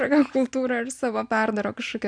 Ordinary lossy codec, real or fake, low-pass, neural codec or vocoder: MP3, 96 kbps; real; 9.9 kHz; none